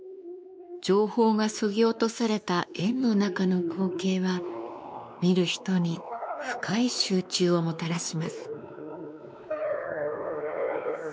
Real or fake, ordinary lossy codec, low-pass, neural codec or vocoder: fake; none; none; codec, 16 kHz, 2 kbps, X-Codec, WavLM features, trained on Multilingual LibriSpeech